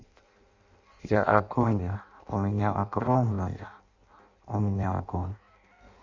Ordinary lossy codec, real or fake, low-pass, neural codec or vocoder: Opus, 64 kbps; fake; 7.2 kHz; codec, 16 kHz in and 24 kHz out, 0.6 kbps, FireRedTTS-2 codec